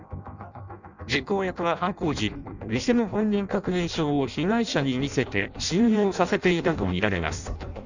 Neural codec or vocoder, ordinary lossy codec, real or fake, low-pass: codec, 16 kHz in and 24 kHz out, 0.6 kbps, FireRedTTS-2 codec; none; fake; 7.2 kHz